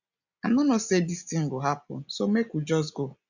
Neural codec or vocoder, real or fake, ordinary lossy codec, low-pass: none; real; none; 7.2 kHz